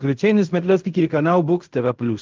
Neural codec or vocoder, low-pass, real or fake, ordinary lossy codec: codec, 16 kHz in and 24 kHz out, 0.4 kbps, LongCat-Audio-Codec, fine tuned four codebook decoder; 7.2 kHz; fake; Opus, 24 kbps